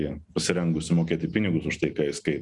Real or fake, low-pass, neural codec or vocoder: real; 10.8 kHz; none